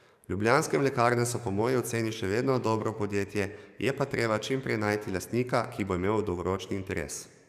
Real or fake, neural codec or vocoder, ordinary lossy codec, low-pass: fake; codec, 44.1 kHz, 7.8 kbps, DAC; none; 14.4 kHz